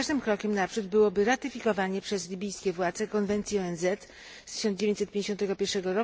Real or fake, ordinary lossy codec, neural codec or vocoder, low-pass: real; none; none; none